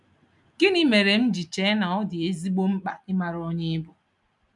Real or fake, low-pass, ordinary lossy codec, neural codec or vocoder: real; 10.8 kHz; none; none